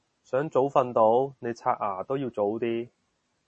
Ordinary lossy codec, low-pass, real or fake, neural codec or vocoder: MP3, 32 kbps; 10.8 kHz; real; none